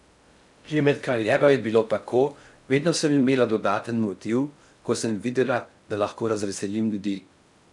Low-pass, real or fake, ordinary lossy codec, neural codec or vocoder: 10.8 kHz; fake; MP3, 96 kbps; codec, 16 kHz in and 24 kHz out, 0.6 kbps, FocalCodec, streaming, 4096 codes